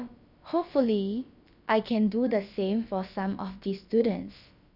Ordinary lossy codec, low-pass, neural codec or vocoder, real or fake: none; 5.4 kHz; codec, 16 kHz, about 1 kbps, DyCAST, with the encoder's durations; fake